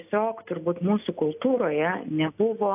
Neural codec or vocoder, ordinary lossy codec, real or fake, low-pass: none; AAC, 32 kbps; real; 3.6 kHz